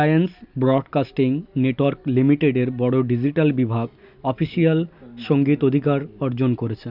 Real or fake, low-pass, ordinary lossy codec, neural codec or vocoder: real; 5.4 kHz; none; none